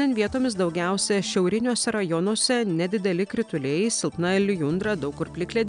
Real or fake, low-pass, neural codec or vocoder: real; 9.9 kHz; none